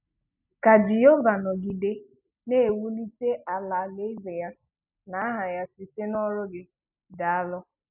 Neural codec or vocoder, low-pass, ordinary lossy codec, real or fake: none; 3.6 kHz; none; real